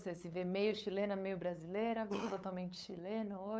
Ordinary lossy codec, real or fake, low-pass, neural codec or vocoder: none; fake; none; codec, 16 kHz, 8 kbps, FunCodec, trained on LibriTTS, 25 frames a second